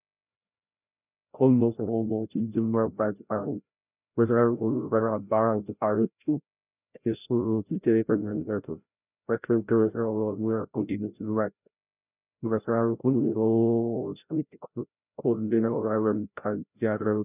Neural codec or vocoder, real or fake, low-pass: codec, 16 kHz, 0.5 kbps, FreqCodec, larger model; fake; 3.6 kHz